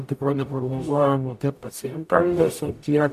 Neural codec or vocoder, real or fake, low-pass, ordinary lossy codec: codec, 44.1 kHz, 0.9 kbps, DAC; fake; 14.4 kHz; MP3, 96 kbps